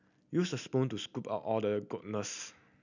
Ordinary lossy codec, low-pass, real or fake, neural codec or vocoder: none; 7.2 kHz; real; none